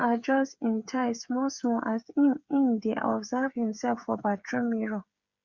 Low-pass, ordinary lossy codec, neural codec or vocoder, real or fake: 7.2 kHz; Opus, 64 kbps; codec, 16 kHz, 8 kbps, FreqCodec, smaller model; fake